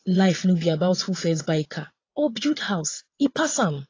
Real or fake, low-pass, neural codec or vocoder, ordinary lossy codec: fake; 7.2 kHz; vocoder, 22.05 kHz, 80 mel bands, WaveNeXt; AAC, 32 kbps